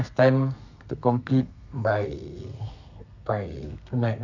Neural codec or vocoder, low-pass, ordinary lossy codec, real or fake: codec, 32 kHz, 1.9 kbps, SNAC; 7.2 kHz; none; fake